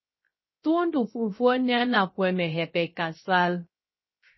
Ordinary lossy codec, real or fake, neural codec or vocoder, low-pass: MP3, 24 kbps; fake; codec, 16 kHz, 0.3 kbps, FocalCodec; 7.2 kHz